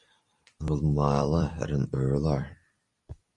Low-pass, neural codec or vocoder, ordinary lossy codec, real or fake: 10.8 kHz; vocoder, 44.1 kHz, 128 mel bands every 256 samples, BigVGAN v2; Opus, 64 kbps; fake